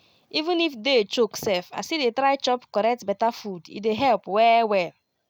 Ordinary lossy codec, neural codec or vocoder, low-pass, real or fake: none; none; 19.8 kHz; real